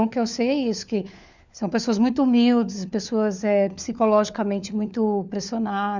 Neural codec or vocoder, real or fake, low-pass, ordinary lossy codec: codec, 16 kHz, 4 kbps, FunCodec, trained on LibriTTS, 50 frames a second; fake; 7.2 kHz; none